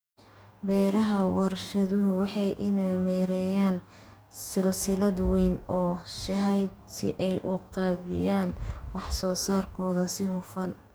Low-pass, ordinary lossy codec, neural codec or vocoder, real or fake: none; none; codec, 44.1 kHz, 2.6 kbps, DAC; fake